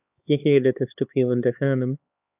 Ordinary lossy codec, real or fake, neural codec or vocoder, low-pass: AAC, 32 kbps; fake; codec, 16 kHz, 4 kbps, X-Codec, HuBERT features, trained on LibriSpeech; 3.6 kHz